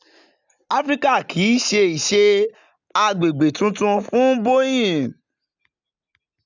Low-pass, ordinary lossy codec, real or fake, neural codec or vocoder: 7.2 kHz; none; real; none